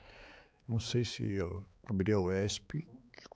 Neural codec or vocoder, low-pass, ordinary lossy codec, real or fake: codec, 16 kHz, 4 kbps, X-Codec, HuBERT features, trained on balanced general audio; none; none; fake